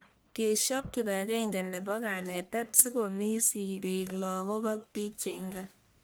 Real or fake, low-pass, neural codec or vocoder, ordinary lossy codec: fake; none; codec, 44.1 kHz, 1.7 kbps, Pupu-Codec; none